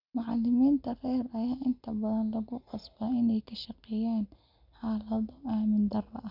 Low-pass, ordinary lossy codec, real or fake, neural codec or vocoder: 5.4 kHz; none; real; none